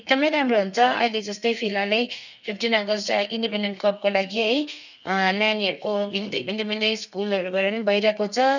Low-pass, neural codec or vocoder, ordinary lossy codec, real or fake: 7.2 kHz; codec, 32 kHz, 1.9 kbps, SNAC; none; fake